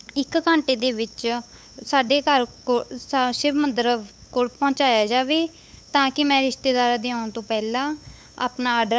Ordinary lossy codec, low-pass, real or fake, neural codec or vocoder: none; none; fake; codec, 16 kHz, 8 kbps, FunCodec, trained on LibriTTS, 25 frames a second